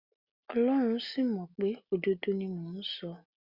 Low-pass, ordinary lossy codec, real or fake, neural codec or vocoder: 5.4 kHz; Opus, 64 kbps; real; none